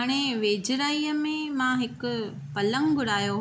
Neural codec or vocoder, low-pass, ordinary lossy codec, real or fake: none; none; none; real